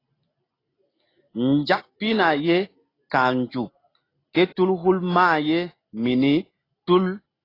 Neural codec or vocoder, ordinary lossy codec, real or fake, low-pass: none; AAC, 24 kbps; real; 5.4 kHz